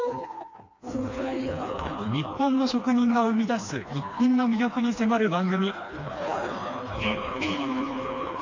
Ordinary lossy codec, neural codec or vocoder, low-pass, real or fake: AAC, 48 kbps; codec, 16 kHz, 2 kbps, FreqCodec, smaller model; 7.2 kHz; fake